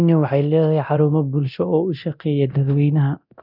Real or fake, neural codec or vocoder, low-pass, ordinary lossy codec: fake; codec, 24 kHz, 0.9 kbps, DualCodec; 5.4 kHz; none